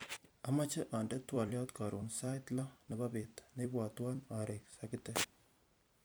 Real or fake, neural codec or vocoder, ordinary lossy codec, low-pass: fake; vocoder, 44.1 kHz, 128 mel bands every 256 samples, BigVGAN v2; none; none